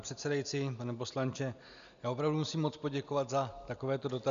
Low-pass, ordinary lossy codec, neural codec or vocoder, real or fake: 7.2 kHz; AAC, 96 kbps; none; real